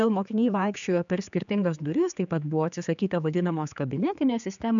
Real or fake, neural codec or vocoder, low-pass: fake; codec, 16 kHz, 4 kbps, X-Codec, HuBERT features, trained on general audio; 7.2 kHz